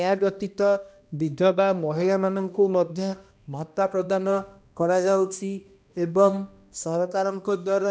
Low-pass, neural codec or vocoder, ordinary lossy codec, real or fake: none; codec, 16 kHz, 1 kbps, X-Codec, HuBERT features, trained on balanced general audio; none; fake